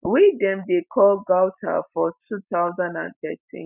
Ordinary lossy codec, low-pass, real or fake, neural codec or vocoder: none; 3.6 kHz; real; none